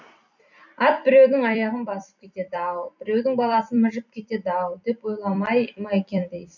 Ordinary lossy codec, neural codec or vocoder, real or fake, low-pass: none; vocoder, 44.1 kHz, 128 mel bands every 256 samples, BigVGAN v2; fake; 7.2 kHz